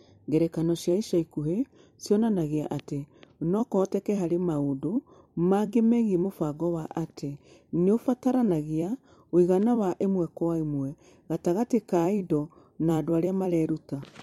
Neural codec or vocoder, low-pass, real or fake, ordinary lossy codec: vocoder, 44.1 kHz, 128 mel bands every 256 samples, BigVGAN v2; 19.8 kHz; fake; MP3, 64 kbps